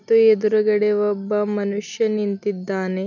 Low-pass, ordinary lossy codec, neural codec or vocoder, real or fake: 7.2 kHz; none; none; real